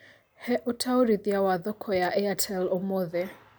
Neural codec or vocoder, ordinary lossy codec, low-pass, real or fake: none; none; none; real